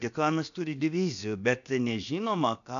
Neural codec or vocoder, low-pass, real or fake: codec, 16 kHz, about 1 kbps, DyCAST, with the encoder's durations; 7.2 kHz; fake